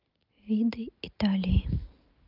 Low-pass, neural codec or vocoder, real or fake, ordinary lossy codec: 5.4 kHz; none; real; Opus, 32 kbps